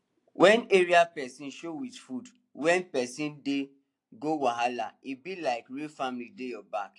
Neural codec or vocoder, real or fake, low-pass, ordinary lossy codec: none; real; 10.8 kHz; AAC, 48 kbps